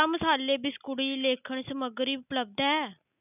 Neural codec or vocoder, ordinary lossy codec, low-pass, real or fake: none; none; 3.6 kHz; real